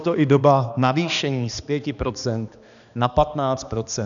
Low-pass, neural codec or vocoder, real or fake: 7.2 kHz; codec, 16 kHz, 2 kbps, X-Codec, HuBERT features, trained on balanced general audio; fake